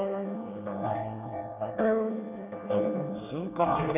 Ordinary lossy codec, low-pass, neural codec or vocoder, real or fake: none; 3.6 kHz; codec, 24 kHz, 1 kbps, SNAC; fake